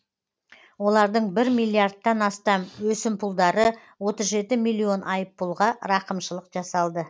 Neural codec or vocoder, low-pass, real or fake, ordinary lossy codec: none; none; real; none